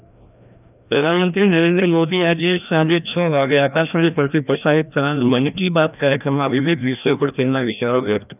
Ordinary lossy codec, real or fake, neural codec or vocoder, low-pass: none; fake; codec, 16 kHz, 1 kbps, FreqCodec, larger model; 3.6 kHz